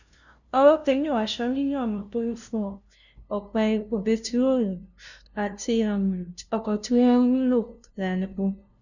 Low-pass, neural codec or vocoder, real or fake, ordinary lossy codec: 7.2 kHz; codec, 16 kHz, 0.5 kbps, FunCodec, trained on LibriTTS, 25 frames a second; fake; none